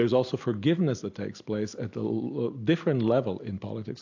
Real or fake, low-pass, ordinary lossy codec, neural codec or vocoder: real; 7.2 kHz; AAC, 48 kbps; none